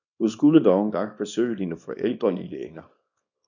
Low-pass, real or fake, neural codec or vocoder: 7.2 kHz; fake; codec, 24 kHz, 0.9 kbps, WavTokenizer, small release